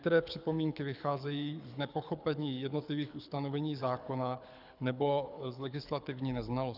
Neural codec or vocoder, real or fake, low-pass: codec, 24 kHz, 6 kbps, HILCodec; fake; 5.4 kHz